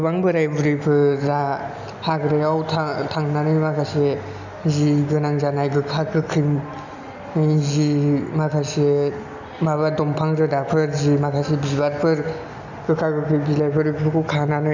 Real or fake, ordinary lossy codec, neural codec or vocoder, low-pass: real; none; none; 7.2 kHz